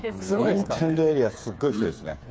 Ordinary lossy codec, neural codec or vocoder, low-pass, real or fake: none; codec, 16 kHz, 8 kbps, FreqCodec, smaller model; none; fake